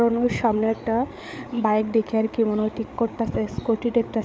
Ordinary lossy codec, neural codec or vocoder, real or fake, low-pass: none; codec, 16 kHz, 8 kbps, FreqCodec, larger model; fake; none